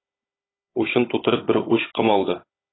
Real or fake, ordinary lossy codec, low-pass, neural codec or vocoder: fake; AAC, 16 kbps; 7.2 kHz; codec, 16 kHz, 16 kbps, FunCodec, trained on Chinese and English, 50 frames a second